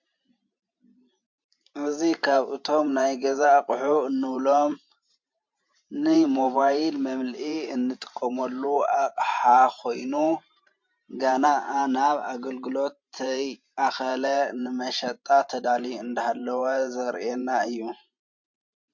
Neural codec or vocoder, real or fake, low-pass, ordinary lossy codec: vocoder, 44.1 kHz, 128 mel bands every 512 samples, BigVGAN v2; fake; 7.2 kHz; MP3, 48 kbps